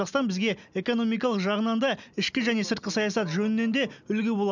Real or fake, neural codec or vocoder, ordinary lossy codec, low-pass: real; none; none; 7.2 kHz